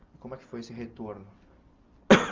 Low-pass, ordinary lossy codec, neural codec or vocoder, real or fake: 7.2 kHz; Opus, 32 kbps; none; real